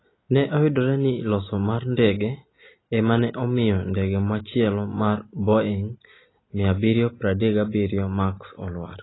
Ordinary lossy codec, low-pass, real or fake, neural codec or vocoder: AAC, 16 kbps; 7.2 kHz; real; none